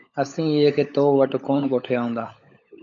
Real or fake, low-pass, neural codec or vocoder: fake; 7.2 kHz; codec, 16 kHz, 16 kbps, FunCodec, trained on LibriTTS, 50 frames a second